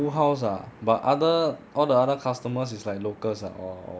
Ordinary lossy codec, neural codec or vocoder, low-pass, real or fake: none; none; none; real